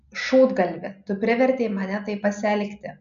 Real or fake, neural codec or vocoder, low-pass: real; none; 7.2 kHz